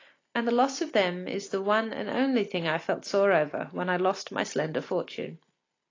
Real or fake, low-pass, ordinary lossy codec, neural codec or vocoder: real; 7.2 kHz; AAC, 32 kbps; none